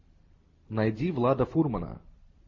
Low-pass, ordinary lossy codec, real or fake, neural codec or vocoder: 7.2 kHz; MP3, 32 kbps; real; none